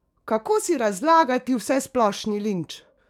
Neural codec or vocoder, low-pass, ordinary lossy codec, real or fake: codec, 44.1 kHz, 7.8 kbps, DAC; 19.8 kHz; none; fake